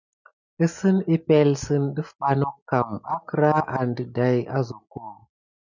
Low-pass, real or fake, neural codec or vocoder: 7.2 kHz; real; none